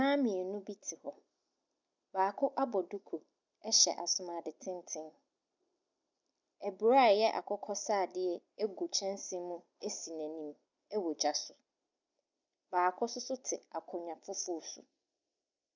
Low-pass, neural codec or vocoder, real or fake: 7.2 kHz; none; real